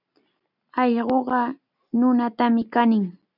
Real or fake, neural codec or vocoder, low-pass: real; none; 5.4 kHz